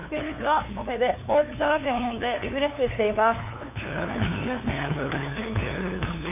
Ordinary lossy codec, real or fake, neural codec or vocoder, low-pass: none; fake; codec, 16 kHz, 2 kbps, FunCodec, trained on LibriTTS, 25 frames a second; 3.6 kHz